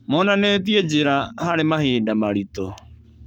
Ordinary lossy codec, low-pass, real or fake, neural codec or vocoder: none; 19.8 kHz; fake; codec, 44.1 kHz, 7.8 kbps, Pupu-Codec